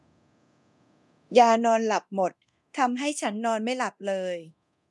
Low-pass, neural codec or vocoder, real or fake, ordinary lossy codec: none; codec, 24 kHz, 0.9 kbps, DualCodec; fake; none